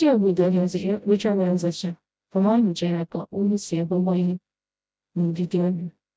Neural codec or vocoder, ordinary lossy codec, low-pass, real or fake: codec, 16 kHz, 0.5 kbps, FreqCodec, smaller model; none; none; fake